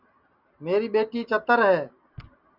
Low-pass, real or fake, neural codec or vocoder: 5.4 kHz; real; none